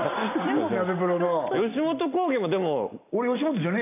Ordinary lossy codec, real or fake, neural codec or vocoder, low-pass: none; real; none; 3.6 kHz